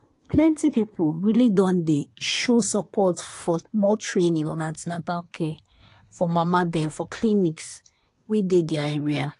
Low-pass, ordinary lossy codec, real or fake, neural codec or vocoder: 10.8 kHz; AAC, 48 kbps; fake; codec, 24 kHz, 1 kbps, SNAC